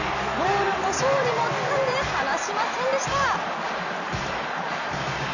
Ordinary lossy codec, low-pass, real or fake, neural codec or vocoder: none; 7.2 kHz; real; none